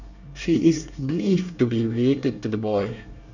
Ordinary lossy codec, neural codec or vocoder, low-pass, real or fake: none; codec, 24 kHz, 1 kbps, SNAC; 7.2 kHz; fake